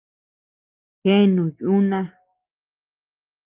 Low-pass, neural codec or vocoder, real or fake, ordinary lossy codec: 3.6 kHz; none; real; Opus, 32 kbps